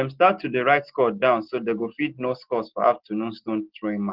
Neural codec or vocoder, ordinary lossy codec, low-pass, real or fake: none; Opus, 16 kbps; 5.4 kHz; real